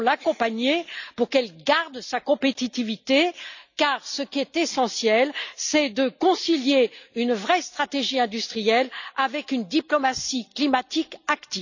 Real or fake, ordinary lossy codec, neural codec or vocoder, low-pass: real; none; none; 7.2 kHz